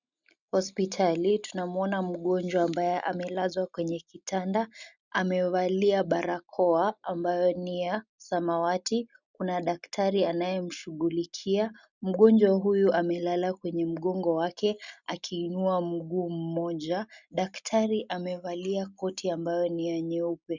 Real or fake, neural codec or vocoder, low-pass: real; none; 7.2 kHz